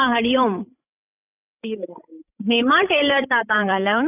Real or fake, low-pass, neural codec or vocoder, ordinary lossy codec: fake; 3.6 kHz; codec, 16 kHz, 16 kbps, FreqCodec, larger model; none